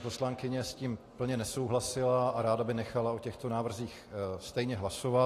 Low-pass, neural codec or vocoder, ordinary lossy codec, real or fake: 14.4 kHz; none; AAC, 48 kbps; real